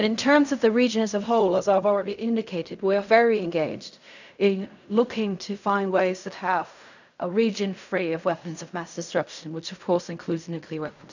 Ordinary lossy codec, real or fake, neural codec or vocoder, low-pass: none; fake; codec, 16 kHz in and 24 kHz out, 0.4 kbps, LongCat-Audio-Codec, fine tuned four codebook decoder; 7.2 kHz